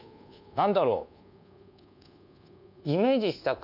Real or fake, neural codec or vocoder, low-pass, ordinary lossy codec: fake; codec, 24 kHz, 1.2 kbps, DualCodec; 5.4 kHz; none